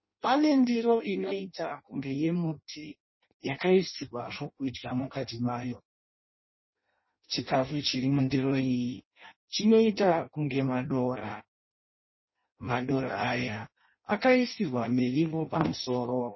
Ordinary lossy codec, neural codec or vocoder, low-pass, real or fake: MP3, 24 kbps; codec, 16 kHz in and 24 kHz out, 0.6 kbps, FireRedTTS-2 codec; 7.2 kHz; fake